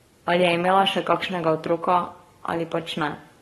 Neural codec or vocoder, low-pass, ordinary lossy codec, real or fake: codec, 44.1 kHz, 7.8 kbps, Pupu-Codec; 19.8 kHz; AAC, 32 kbps; fake